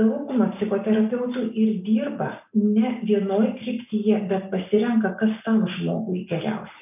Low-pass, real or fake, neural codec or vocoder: 3.6 kHz; real; none